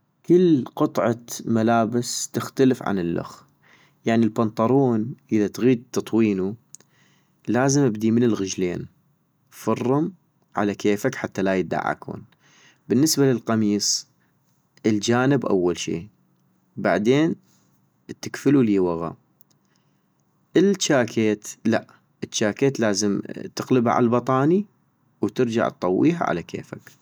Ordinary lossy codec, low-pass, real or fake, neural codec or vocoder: none; none; real; none